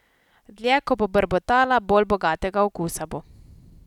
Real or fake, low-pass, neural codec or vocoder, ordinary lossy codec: real; 19.8 kHz; none; none